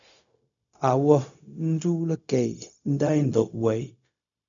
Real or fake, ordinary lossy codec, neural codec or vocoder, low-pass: fake; MP3, 96 kbps; codec, 16 kHz, 0.4 kbps, LongCat-Audio-Codec; 7.2 kHz